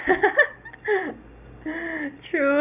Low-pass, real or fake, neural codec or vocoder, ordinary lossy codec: 3.6 kHz; real; none; none